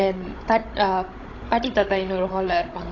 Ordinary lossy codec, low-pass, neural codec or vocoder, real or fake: AAC, 32 kbps; 7.2 kHz; codec, 16 kHz, 16 kbps, FunCodec, trained on Chinese and English, 50 frames a second; fake